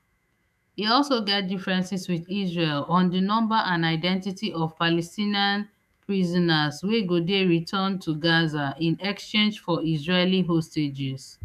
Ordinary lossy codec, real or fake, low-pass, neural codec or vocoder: none; fake; 14.4 kHz; autoencoder, 48 kHz, 128 numbers a frame, DAC-VAE, trained on Japanese speech